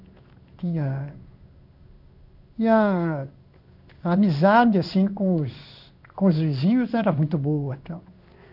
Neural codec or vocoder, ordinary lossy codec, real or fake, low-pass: codec, 16 kHz in and 24 kHz out, 1 kbps, XY-Tokenizer; none; fake; 5.4 kHz